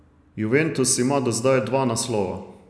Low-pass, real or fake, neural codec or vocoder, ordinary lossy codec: none; real; none; none